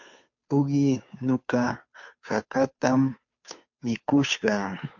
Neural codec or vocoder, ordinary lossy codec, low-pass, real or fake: codec, 16 kHz, 2 kbps, FunCodec, trained on Chinese and English, 25 frames a second; MP3, 48 kbps; 7.2 kHz; fake